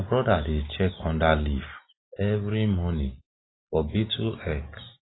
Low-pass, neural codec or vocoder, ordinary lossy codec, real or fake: 7.2 kHz; none; AAC, 16 kbps; real